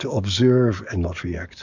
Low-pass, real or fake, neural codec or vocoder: 7.2 kHz; fake; codec, 44.1 kHz, 7.8 kbps, DAC